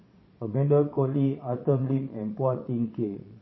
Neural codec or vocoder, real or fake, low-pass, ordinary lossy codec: vocoder, 22.05 kHz, 80 mel bands, Vocos; fake; 7.2 kHz; MP3, 24 kbps